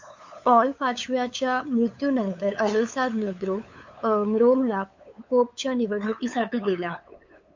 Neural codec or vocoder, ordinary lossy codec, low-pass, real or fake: codec, 16 kHz, 8 kbps, FunCodec, trained on LibriTTS, 25 frames a second; MP3, 48 kbps; 7.2 kHz; fake